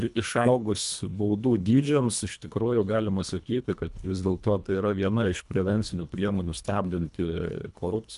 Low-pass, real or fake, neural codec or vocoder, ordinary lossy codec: 10.8 kHz; fake; codec, 24 kHz, 1.5 kbps, HILCodec; MP3, 96 kbps